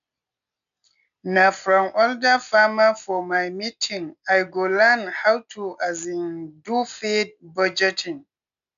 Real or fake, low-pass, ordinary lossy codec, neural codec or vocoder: real; 7.2 kHz; none; none